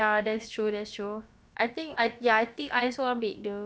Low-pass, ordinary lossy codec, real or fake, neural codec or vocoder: none; none; fake; codec, 16 kHz, about 1 kbps, DyCAST, with the encoder's durations